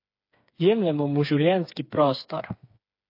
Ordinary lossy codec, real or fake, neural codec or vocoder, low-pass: MP3, 32 kbps; fake; codec, 16 kHz, 4 kbps, FreqCodec, smaller model; 5.4 kHz